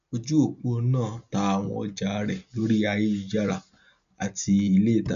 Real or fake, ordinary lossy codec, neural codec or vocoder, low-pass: real; none; none; 7.2 kHz